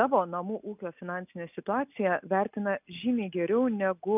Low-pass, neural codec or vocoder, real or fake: 3.6 kHz; none; real